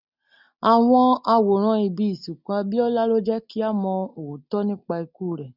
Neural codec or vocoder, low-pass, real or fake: none; 5.4 kHz; real